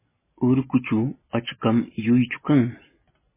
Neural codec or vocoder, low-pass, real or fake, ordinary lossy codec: none; 3.6 kHz; real; MP3, 16 kbps